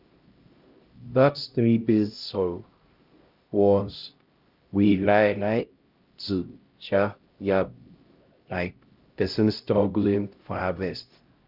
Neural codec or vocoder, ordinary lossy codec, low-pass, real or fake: codec, 16 kHz, 0.5 kbps, X-Codec, HuBERT features, trained on LibriSpeech; Opus, 24 kbps; 5.4 kHz; fake